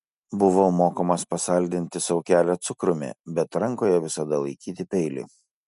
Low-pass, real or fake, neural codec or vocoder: 10.8 kHz; real; none